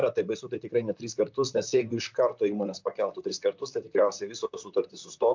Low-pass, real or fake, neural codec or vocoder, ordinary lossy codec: 7.2 kHz; fake; vocoder, 44.1 kHz, 128 mel bands, Pupu-Vocoder; MP3, 64 kbps